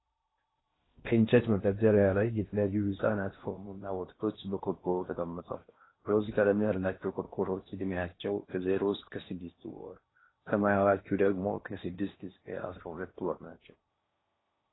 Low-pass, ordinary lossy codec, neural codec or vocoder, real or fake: 7.2 kHz; AAC, 16 kbps; codec, 16 kHz in and 24 kHz out, 0.8 kbps, FocalCodec, streaming, 65536 codes; fake